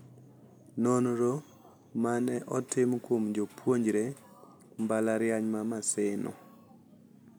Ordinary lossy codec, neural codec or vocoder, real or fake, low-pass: none; none; real; none